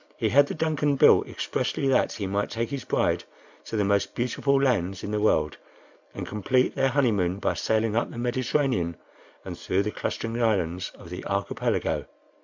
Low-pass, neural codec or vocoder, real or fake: 7.2 kHz; none; real